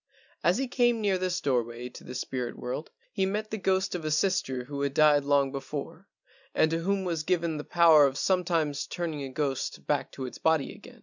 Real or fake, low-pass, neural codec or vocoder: real; 7.2 kHz; none